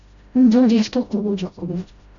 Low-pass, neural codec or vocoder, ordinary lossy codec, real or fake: 7.2 kHz; codec, 16 kHz, 0.5 kbps, FreqCodec, smaller model; Opus, 64 kbps; fake